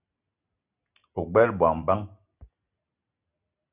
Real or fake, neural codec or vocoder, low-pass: real; none; 3.6 kHz